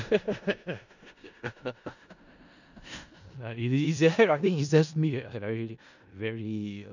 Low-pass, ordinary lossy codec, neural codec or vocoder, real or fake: 7.2 kHz; none; codec, 16 kHz in and 24 kHz out, 0.4 kbps, LongCat-Audio-Codec, four codebook decoder; fake